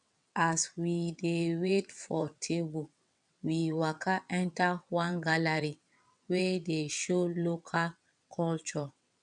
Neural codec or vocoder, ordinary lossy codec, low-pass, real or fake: vocoder, 22.05 kHz, 80 mel bands, WaveNeXt; none; 9.9 kHz; fake